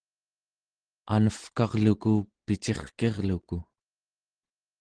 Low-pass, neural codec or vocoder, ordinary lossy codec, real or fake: 9.9 kHz; none; Opus, 16 kbps; real